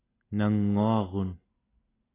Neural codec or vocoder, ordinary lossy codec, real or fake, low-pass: none; AAC, 16 kbps; real; 3.6 kHz